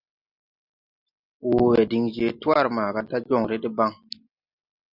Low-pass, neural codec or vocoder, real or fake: 5.4 kHz; none; real